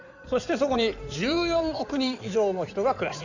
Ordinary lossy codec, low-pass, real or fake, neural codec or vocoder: none; 7.2 kHz; fake; codec, 16 kHz in and 24 kHz out, 2.2 kbps, FireRedTTS-2 codec